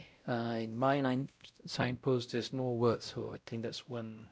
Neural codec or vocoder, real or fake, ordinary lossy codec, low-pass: codec, 16 kHz, 0.5 kbps, X-Codec, WavLM features, trained on Multilingual LibriSpeech; fake; none; none